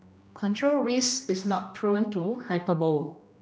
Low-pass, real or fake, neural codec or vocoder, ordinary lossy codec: none; fake; codec, 16 kHz, 1 kbps, X-Codec, HuBERT features, trained on general audio; none